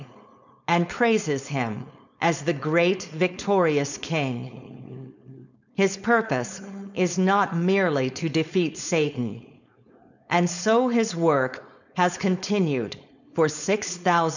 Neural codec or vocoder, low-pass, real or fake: codec, 16 kHz, 4.8 kbps, FACodec; 7.2 kHz; fake